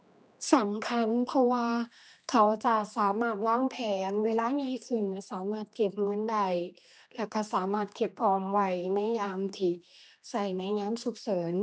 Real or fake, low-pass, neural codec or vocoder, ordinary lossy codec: fake; none; codec, 16 kHz, 2 kbps, X-Codec, HuBERT features, trained on general audio; none